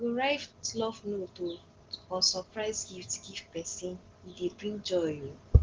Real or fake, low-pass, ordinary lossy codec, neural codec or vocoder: real; 7.2 kHz; Opus, 16 kbps; none